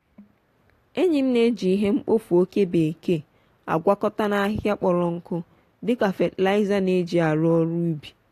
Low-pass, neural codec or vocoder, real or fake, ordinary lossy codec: 14.4 kHz; none; real; AAC, 48 kbps